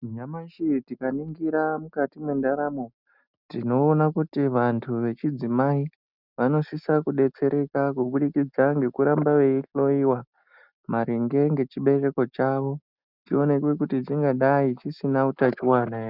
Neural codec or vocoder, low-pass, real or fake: none; 5.4 kHz; real